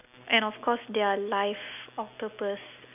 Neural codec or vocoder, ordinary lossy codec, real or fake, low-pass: none; none; real; 3.6 kHz